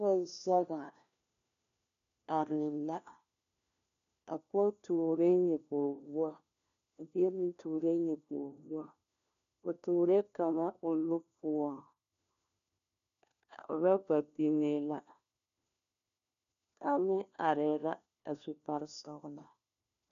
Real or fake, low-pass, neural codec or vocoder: fake; 7.2 kHz; codec, 16 kHz, 1 kbps, FunCodec, trained on LibriTTS, 50 frames a second